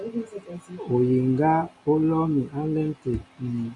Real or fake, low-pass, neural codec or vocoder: real; 10.8 kHz; none